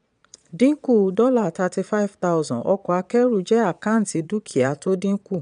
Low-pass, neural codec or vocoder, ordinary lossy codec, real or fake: 9.9 kHz; vocoder, 22.05 kHz, 80 mel bands, Vocos; none; fake